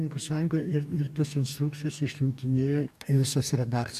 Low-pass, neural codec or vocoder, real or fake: 14.4 kHz; codec, 44.1 kHz, 2.6 kbps, DAC; fake